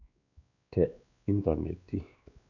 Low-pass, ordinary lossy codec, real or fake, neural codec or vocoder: 7.2 kHz; none; fake; codec, 16 kHz, 2 kbps, X-Codec, WavLM features, trained on Multilingual LibriSpeech